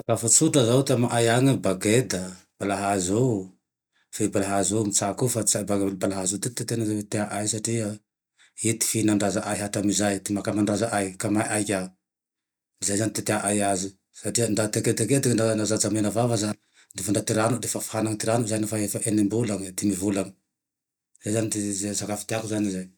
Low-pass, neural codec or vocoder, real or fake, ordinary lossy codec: none; none; real; none